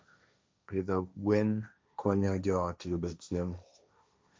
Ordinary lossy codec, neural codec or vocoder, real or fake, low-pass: none; codec, 16 kHz, 1.1 kbps, Voila-Tokenizer; fake; none